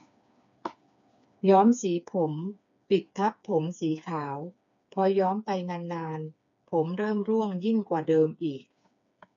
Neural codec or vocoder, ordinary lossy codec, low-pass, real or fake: codec, 16 kHz, 4 kbps, FreqCodec, smaller model; none; 7.2 kHz; fake